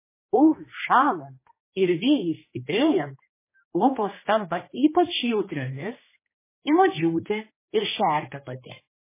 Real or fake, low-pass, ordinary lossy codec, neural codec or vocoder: fake; 3.6 kHz; MP3, 16 kbps; codec, 16 kHz, 1 kbps, X-Codec, HuBERT features, trained on general audio